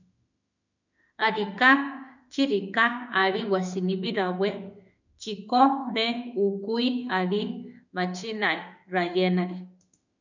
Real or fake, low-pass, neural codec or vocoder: fake; 7.2 kHz; autoencoder, 48 kHz, 32 numbers a frame, DAC-VAE, trained on Japanese speech